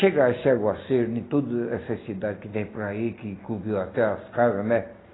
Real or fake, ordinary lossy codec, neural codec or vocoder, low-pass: real; AAC, 16 kbps; none; 7.2 kHz